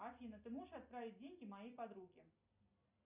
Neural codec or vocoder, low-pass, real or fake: none; 3.6 kHz; real